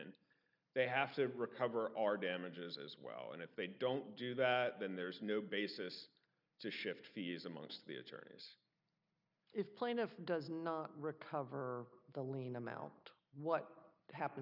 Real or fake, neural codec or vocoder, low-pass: real; none; 5.4 kHz